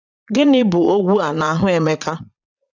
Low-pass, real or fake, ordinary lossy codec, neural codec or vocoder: 7.2 kHz; fake; none; vocoder, 22.05 kHz, 80 mel bands, Vocos